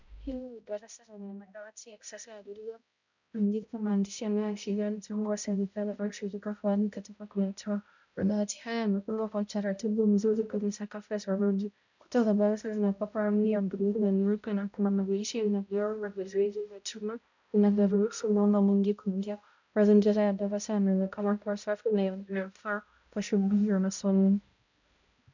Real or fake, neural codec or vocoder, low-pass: fake; codec, 16 kHz, 0.5 kbps, X-Codec, HuBERT features, trained on balanced general audio; 7.2 kHz